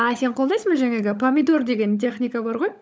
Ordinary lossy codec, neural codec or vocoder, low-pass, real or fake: none; codec, 16 kHz, 16 kbps, FunCodec, trained on LibriTTS, 50 frames a second; none; fake